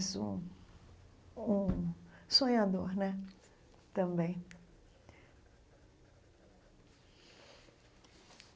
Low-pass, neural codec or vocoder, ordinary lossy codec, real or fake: none; none; none; real